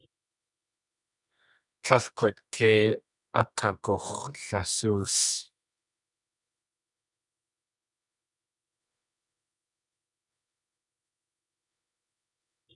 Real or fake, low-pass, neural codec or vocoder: fake; 10.8 kHz; codec, 24 kHz, 0.9 kbps, WavTokenizer, medium music audio release